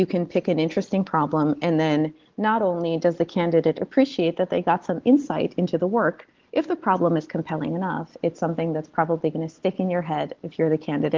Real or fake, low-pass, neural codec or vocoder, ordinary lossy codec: real; 7.2 kHz; none; Opus, 16 kbps